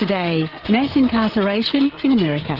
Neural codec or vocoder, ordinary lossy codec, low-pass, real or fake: codec, 16 kHz, 8 kbps, FreqCodec, larger model; Opus, 16 kbps; 5.4 kHz; fake